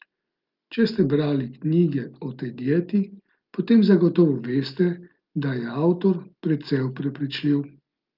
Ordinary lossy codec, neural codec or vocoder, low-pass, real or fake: Opus, 32 kbps; none; 5.4 kHz; real